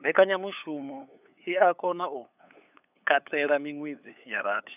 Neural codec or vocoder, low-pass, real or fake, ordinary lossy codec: codec, 16 kHz, 8 kbps, FunCodec, trained on LibriTTS, 25 frames a second; 3.6 kHz; fake; none